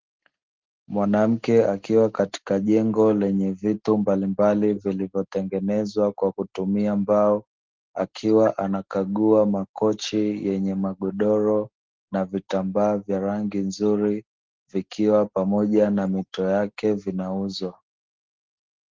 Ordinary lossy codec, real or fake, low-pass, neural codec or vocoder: Opus, 16 kbps; real; 7.2 kHz; none